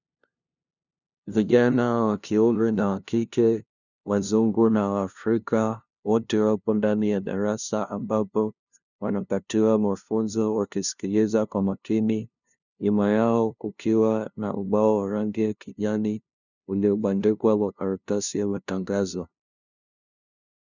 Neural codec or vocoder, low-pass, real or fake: codec, 16 kHz, 0.5 kbps, FunCodec, trained on LibriTTS, 25 frames a second; 7.2 kHz; fake